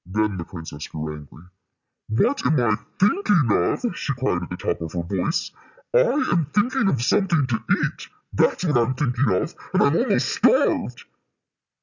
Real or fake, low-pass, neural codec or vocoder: real; 7.2 kHz; none